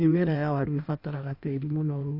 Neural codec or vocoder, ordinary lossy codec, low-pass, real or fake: codec, 16 kHz in and 24 kHz out, 1.1 kbps, FireRedTTS-2 codec; AAC, 32 kbps; 5.4 kHz; fake